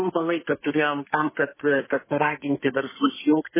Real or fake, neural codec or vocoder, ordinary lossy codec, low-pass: fake; codec, 32 kHz, 1.9 kbps, SNAC; MP3, 16 kbps; 3.6 kHz